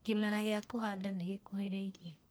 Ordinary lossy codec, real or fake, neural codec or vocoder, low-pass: none; fake; codec, 44.1 kHz, 1.7 kbps, Pupu-Codec; none